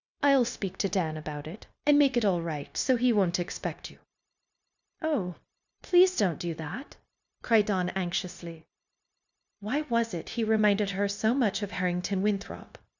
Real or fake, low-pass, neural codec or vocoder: fake; 7.2 kHz; codec, 16 kHz, 0.9 kbps, LongCat-Audio-Codec